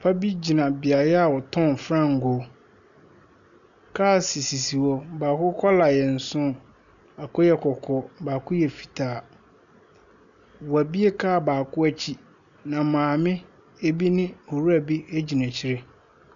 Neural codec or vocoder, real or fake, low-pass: none; real; 7.2 kHz